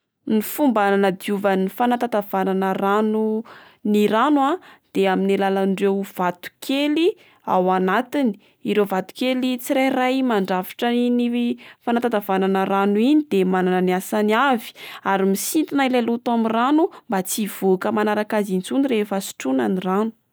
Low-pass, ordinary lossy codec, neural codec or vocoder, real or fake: none; none; none; real